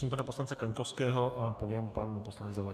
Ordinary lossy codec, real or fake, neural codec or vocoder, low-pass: MP3, 96 kbps; fake; codec, 44.1 kHz, 2.6 kbps, DAC; 14.4 kHz